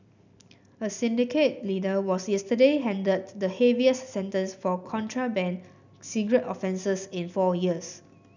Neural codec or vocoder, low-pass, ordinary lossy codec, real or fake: none; 7.2 kHz; none; real